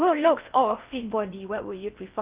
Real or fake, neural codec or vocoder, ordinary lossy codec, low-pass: fake; codec, 16 kHz in and 24 kHz out, 0.6 kbps, FocalCodec, streaming, 4096 codes; Opus, 24 kbps; 3.6 kHz